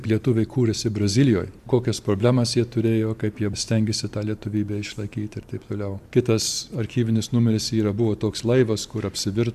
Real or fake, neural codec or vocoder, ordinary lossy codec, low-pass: fake; vocoder, 44.1 kHz, 128 mel bands every 512 samples, BigVGAN v2; AAC, 96 kbps; 14.4 kHz